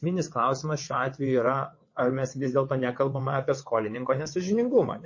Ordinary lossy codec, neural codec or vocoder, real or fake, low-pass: MP3, 32 kbps; vocoder, 22.05 kHz, 80 mel bands, WaveNeXt; fake; 7.2 kHz